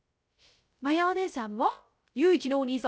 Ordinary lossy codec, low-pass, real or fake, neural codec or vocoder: none; none; fake; codec, 16 kHz, 0.3 kbps, FocalCodec